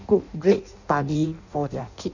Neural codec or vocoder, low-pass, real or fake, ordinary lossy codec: codec, 16 kHz in and 24 kHz out, 0.6 kbps, FireRedTTS-2 codec; 7.2 kHz; fake; none